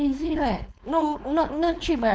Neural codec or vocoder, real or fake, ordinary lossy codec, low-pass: codec, 16 kHz, 4.8 kbps, FACodec; fake; none; none